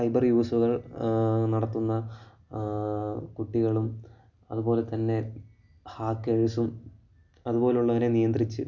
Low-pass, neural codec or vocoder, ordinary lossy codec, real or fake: 7.2 kHz; none; none; real